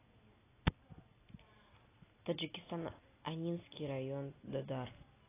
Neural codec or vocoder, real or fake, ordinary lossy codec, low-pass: none; real; AAC, 16 kbps; 3.6 kHz